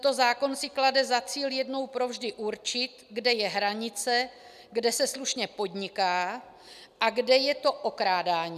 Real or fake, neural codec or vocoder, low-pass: real; none; 14.4 kHz